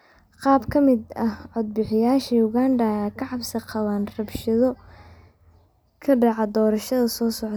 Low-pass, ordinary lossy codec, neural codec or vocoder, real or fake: none; none; none; real